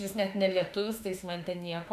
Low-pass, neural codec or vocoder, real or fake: 14.4 kHz; autoencoder, 48 kHz, 32 numbers a frame, DAC-VAE, trained on Japanese speech; fake